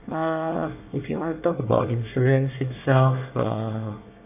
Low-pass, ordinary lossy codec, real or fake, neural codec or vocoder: 3.6 kHz; none; fake; codec, 24 kHz, 1 kbps, SNAC